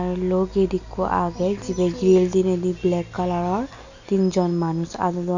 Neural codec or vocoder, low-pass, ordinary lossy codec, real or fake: none; 7.2 kHz; none; real